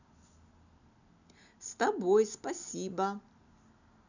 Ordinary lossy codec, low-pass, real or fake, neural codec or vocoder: none; 7.2 kHz; real; none